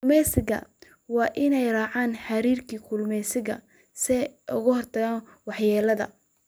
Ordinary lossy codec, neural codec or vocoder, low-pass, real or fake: none; none; none; real